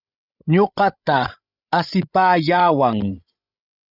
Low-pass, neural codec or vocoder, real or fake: 5.4 kHz; codec, 16 kHz, 16 kbps, FreqCodec, larger model; fake